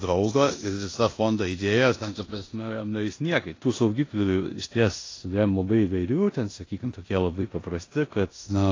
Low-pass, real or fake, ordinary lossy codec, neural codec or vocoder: 7.2 kHz; fake; AAC, 32 kbps; codec, 16 kHz in and 24 kHz out, 0.9 kbps, LongCat-Audio-Codec, four codebook decoder